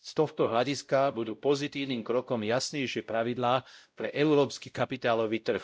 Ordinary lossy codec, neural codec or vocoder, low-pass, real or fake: none; codec, 16 kHz, 0.5 kbps, X-Codec, WavLM features, trained on Multilingual LibriSpeech; none; fake